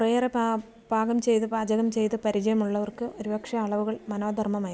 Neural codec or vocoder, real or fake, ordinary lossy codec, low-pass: none; real; none; none